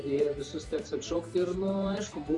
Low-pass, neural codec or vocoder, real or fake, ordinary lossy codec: 10.8 kHz; vocoder, 44.1 kHz, 128 mel bands every 512 samples, BigVGAN v2; fake; Opus, 64 kbps